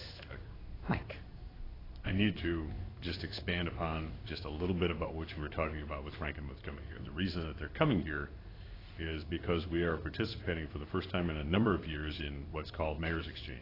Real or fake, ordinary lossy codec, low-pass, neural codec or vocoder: fake; AAC, 24 kbps; 5.4 kHz; codec, 16 kHz in and 24 kHz out, 1 kbps, XY-Tokenizer